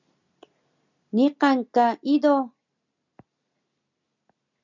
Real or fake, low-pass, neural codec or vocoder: real; 7.2 kHz; none